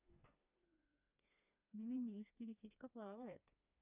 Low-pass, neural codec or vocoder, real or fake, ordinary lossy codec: 3.6 kHz; codec, 16 kHz, 2 kbps, FreqCodec, smaller model; fake; none